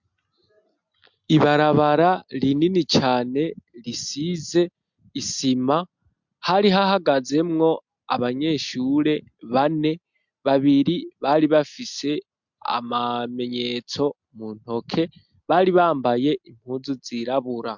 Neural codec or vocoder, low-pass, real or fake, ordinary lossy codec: none; 7.2 kHz; real; MP3, 64 kbps